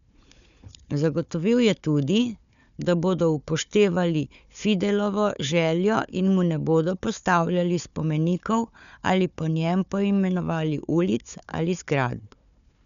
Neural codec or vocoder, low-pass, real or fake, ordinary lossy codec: codec, 16 kHz, 4 kbps, FunCodec, trained on Chinese and English, 50 frames a second; 7.2 kHz; fake; none